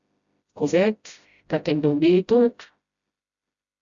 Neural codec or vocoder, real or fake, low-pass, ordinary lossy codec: codec, 16 kHz, 0.5 kbps, FreqCodec, smaller model; fake; 7.2 kHz; Opus, 64 kbps